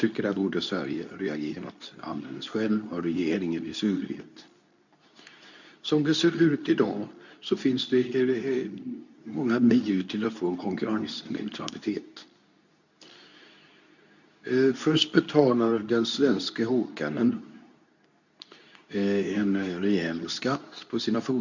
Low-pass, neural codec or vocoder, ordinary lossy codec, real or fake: 7.2 kHz; codec, 24 kHz, 0.9 kbps, WavTokenizer, medium speech release version 2; none; fake